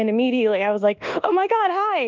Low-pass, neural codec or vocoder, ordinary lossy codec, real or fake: 7.2 kHz; codec, 24 kHz, 1.2 kbps, DualCodec; Opus, 32 kbps; fake